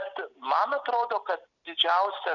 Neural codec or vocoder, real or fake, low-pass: none; real; 7.2 kHz